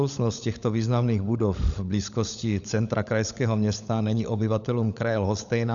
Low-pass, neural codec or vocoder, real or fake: 7.2 kHz; codec, 16 kHz, 16 kbps, FunCodec, trained on LibriTTS, 50 frames a second; fake